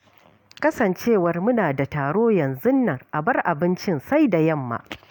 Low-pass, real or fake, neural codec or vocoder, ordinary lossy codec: 19.8 kHz; real; none; none